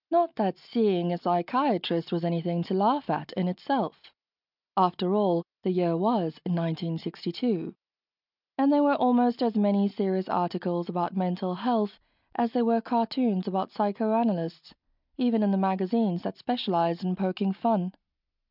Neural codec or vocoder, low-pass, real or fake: none; 5.4 kHz; real